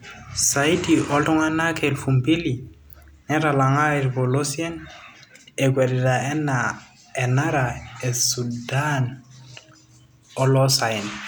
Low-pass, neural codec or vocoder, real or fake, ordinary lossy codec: none; none; real; none